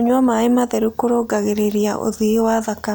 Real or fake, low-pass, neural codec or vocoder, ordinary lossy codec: real; none; none; none